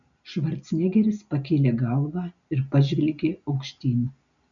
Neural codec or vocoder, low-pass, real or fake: none; 7.2 kHz; real